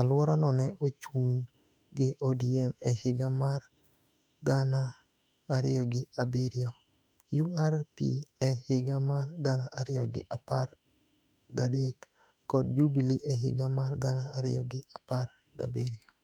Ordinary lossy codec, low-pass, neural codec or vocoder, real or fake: none; 19.8 kHz; autoencoder, 48 kHz, 32 numbers a frame, DAC-VAE, trained on Japanese speech; fake